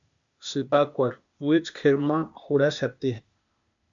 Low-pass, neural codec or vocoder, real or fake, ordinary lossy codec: 7.2 kHz; codec, 16 kHz, 0.8 kbps, ZipCodec; fake; MP3, 64 kbps